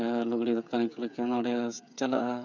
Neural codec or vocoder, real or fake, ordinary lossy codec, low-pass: codec, 16 kHz, 8 kbps, FreqCodec, smaller model; fake; none; 7.2 kHz